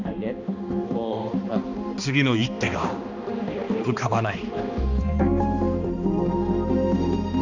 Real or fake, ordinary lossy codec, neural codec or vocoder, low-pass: fake; none; codec, 16 kHz, 2 kbps, X-Codec, HuBERT features, trained on balanced general audio; 7.2 kHz